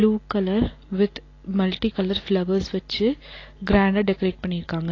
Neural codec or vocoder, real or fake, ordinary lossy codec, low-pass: none; real; AAC, 32 kbps; 7.2 kHz